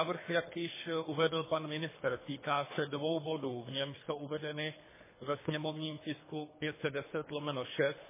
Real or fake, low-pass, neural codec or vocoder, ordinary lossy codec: fake; 3.6 kHz; codec, 24 kHz, 3 kbps, HILCodec; MP3, 16 kbps